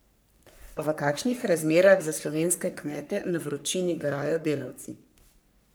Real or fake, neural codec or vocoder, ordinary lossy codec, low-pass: fake; codec, 44.1 kHz, 3.4 kbps, Pupu-Codec; none; none